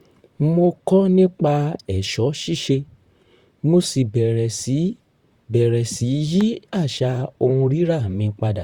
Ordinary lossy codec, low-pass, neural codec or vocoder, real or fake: Opus, 64 kbps; 19.8 kHz; vocoder, 44.1 kHz, 128 mel bands, Pupu-Vocoder; fake